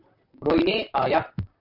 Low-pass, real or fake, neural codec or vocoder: 5.4 kHz; fake; vocoder, 44.1 kHz, 128 mel bands, Pupu-Vocoder